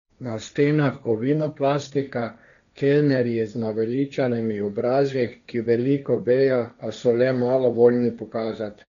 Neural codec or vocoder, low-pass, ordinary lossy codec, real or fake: codec, 16 kHz, 1.1 kbps, Voila-Tokenizer; 7.2 kHz; none; fake